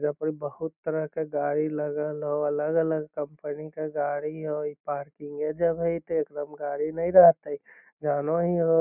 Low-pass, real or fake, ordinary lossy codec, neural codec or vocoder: 3.6 kHz; real; none; none